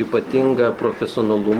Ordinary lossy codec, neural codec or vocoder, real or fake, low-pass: Opus, 16 kbps; none; real; 19.8 kHz